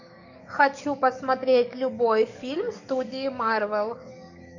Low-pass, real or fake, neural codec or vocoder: 7.2 kHz; fake; codec, 44.1 kHz, 7.8 kbps, DAC